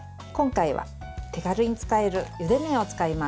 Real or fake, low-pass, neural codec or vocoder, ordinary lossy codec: real; none; none; none